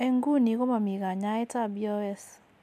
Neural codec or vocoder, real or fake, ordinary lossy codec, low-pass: none; real; none; 14.4 kHz